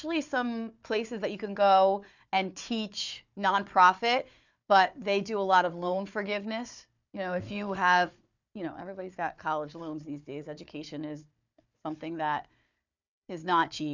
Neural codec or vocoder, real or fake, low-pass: codec, 16 kHz, 4 kbps, FunCodec, trained on Chinese and English, 50 frames a second; fake; 7.2 kHz